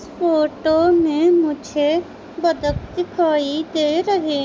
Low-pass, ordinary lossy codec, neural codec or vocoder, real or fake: none; none; none; real